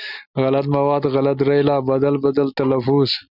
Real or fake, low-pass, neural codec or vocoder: real; 5.4 kHz; none